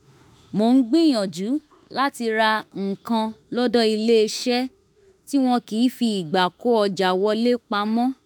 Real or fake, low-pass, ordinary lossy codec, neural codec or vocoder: fake; none; none; autoencoder, 48 kHz, 32 numbers a frame, DAC-VAE, trained on Japanese speech